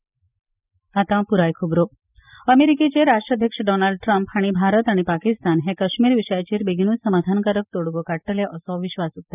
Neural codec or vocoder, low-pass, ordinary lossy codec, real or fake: none; 3.6 kHz; none; real